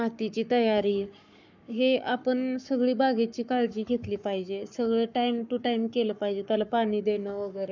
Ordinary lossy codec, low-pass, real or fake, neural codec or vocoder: none; 7.2 kHz; fake; codec, 44.1 kHz, 7.8 kbps, Pupu-Codec